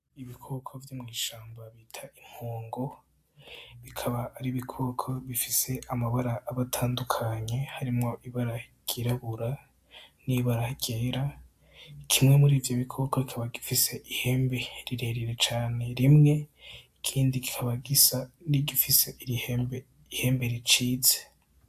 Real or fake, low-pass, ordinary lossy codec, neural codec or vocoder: real; 14.4 kHz; AAC, 96 kbps; none